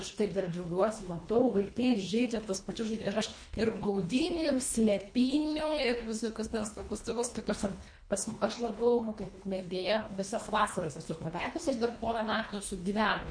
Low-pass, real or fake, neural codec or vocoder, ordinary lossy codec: 9.9 kHz; fake; codec, 24 kHz, 1.5 kbps, HILCodec; MP3, 48 kbps